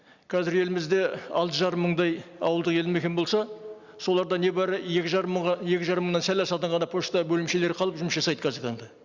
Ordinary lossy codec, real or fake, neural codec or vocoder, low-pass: Opus, 64 kbps; real; none; 7.2 kHz